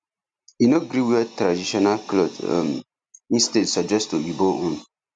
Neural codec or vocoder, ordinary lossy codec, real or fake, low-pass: none; none; real; 9.9 kHz